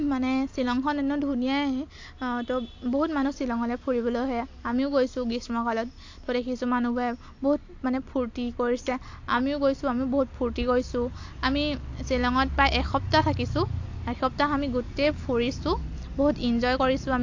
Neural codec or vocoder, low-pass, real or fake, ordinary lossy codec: none; 7.2 kHz; real; AAC, 48 kbps